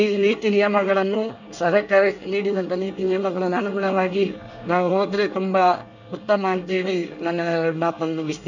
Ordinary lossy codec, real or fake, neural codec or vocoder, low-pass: none; fake; codec, 24 kHz, 1 kbps, SNAC; 7.2 kHz